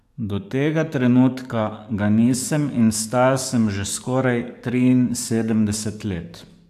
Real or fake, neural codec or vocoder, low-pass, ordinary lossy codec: fake; codec, 44.1 kHz, 7.8 kbps, DAC; 14.4 kHz; AAC, 96 kbps